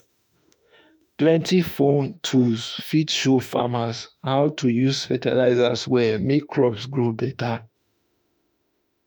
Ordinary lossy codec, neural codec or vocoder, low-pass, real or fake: none; autoencoder, 48 kHz, 32 numbers a frame, DAC-VAE, trained on Japanese speech; none; fake